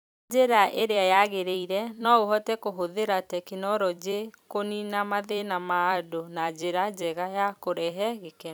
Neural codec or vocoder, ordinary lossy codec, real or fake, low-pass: vocoder, 44.1 kHz, 128 mel bands every 256 samples, BigVGAN v2; none; fake; none